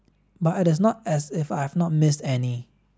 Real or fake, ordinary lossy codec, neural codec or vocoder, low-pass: real; none; none; none